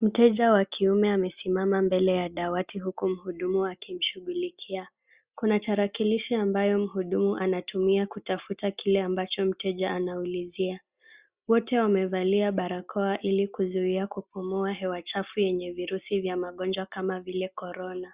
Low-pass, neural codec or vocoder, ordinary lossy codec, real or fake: 3.6 kHz; none; Opus, 64 kbps; real